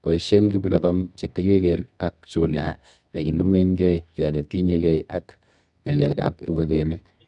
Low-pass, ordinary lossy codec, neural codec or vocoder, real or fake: 10.8 kHz; none; codec, 24 kHz, 0.9 kbps, WavTokenizer, medium music audio release; fake